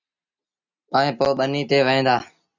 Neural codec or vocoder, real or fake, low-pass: none; real; 7.2 kHz